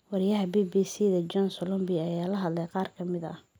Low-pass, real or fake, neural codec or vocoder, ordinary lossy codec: none; real; none; none